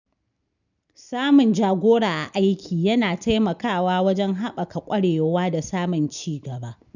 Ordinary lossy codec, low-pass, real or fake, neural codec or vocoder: none; 7.2 kHz; real; none